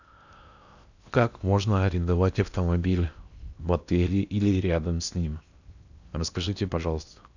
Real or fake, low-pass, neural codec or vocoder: fake; 7.2 kHz; codec, 16 kHz in and 24 kHz out, 0.8 kbps, FocalCodec, streaming, 65536 codes